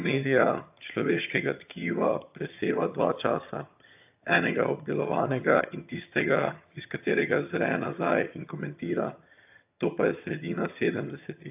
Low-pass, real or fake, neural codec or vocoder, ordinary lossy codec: 3.6 kHz; fake; vocoder, 22.05 kHz, 80 mel bands, HiFi-GAN; AAC, 32 kbps